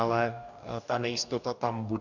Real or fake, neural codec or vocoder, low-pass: fake; codec, 44.1 kHz, 2.6 kbps, DAC; 7.2 kHz